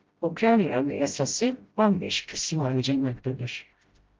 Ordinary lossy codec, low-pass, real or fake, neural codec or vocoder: Opus, 24 kbps; 7.2 kHz; fake; codec, 16 kHz, 0.5 kbps, FreqCodec, smaller model